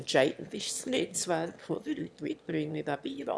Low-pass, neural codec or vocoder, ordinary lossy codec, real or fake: none; autoencoder, 22.05 kHz, a latent of 192 numbers a frame, VITS, trained on one speaker; none; fake